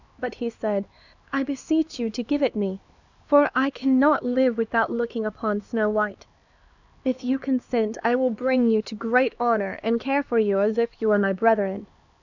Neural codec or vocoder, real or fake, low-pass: codec, 16 kHz, 2 kbps, X-Codec, HuBERT features, trained on LibriSpeech; fake; 7.2 kHz